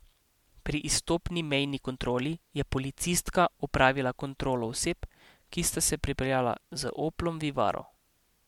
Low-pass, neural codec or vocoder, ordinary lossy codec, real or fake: 19.8 kHz; none; MP3, 96 kbps; real